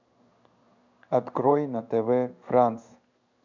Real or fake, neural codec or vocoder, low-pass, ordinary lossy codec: fake; codec, 16 kHz in and 24 kHz out, 1 kbps, XY-Tokenizer; 7.2 kHz; MP3, 64 kbps